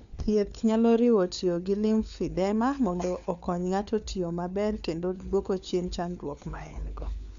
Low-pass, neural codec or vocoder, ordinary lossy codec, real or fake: 7.2 kHz; codec, 16 kHz, 4 kbps, FunCodec, trained on LibriTTS, 50 frames a second; none; fake